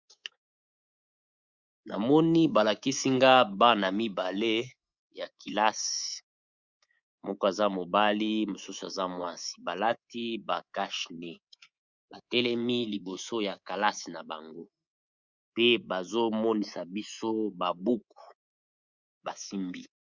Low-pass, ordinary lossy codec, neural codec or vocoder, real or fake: 7.2 kHz; Opus, 64 kbps; codec, 24 kHz, 3.1 kbps, DualCodec; fake